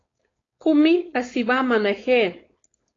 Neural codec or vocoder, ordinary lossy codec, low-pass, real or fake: codec, 16 kHz, 4.8 kbps, FACodec; AAC, 32 kbps; 7.2 kHz; fake